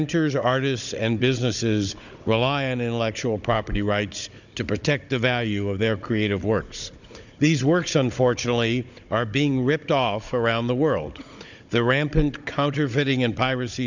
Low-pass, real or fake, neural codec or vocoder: 7.2 kHz; fake; codec, 16 kHz, 16 kbps, FunCodec, trained on Chinese and English, 50 frames a second